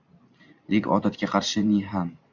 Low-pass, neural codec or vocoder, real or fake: 7.2 kHz; vocoder, 44.1 kHz, 128 mel bands every 512 samples, BigVGAN v2; fake